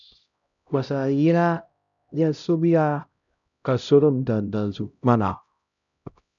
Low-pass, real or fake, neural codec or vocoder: 7.2 kHz; fake; codec, 16 kHz, 0.5 kbps, X-Codec, HuBERT features, trained on LibriSpeech